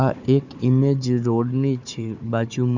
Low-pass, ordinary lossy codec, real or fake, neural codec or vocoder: 7.2 kHz; Opus, 64 kbps; fake; codec, 16 kHz, 4 kbps, FunCodec, trained on Chinese and English, 50 frames a second